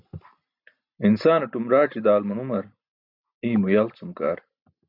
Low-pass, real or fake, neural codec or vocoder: 5.4 kHz; real; none